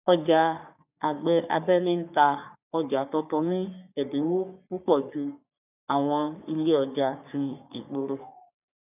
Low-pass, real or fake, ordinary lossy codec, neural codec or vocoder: 3.6 kHz; fake; none; codec, 44.1 kHz, 3.4 kbps, Pupu-Codec